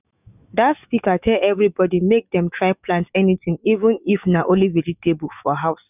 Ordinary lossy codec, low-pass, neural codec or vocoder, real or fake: none; 3.6 kHz; autoencoder, 48 kHz, 128 numbers a frame, DAC-VAE, trained on Japanese speech; fake